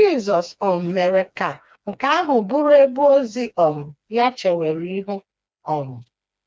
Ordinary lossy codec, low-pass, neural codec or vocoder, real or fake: none; none; codec, 16 kHz, 2 kbps, FreqCodec, smaller model; fake